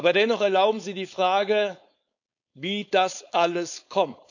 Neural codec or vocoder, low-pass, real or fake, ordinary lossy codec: codec, 16 kHz, 4.8 kbps, FACodec; 7.2 kHz; fake; none